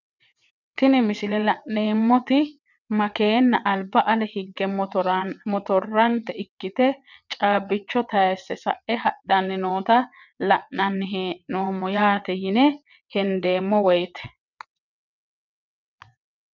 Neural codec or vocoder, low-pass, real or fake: vocoder, 22.05 kHz, 80 mel bands, WaveNeXt; 7.2 kHz; fake